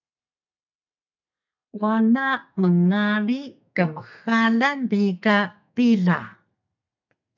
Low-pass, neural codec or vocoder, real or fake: 7.2 kHz; codec, 32 kHz, 1.9 kbps, SNAC; fake